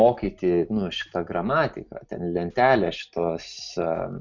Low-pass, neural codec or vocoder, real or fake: 7.2 kHz; none; real